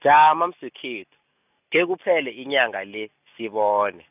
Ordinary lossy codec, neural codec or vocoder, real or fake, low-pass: none; none; real; 3.6 kHz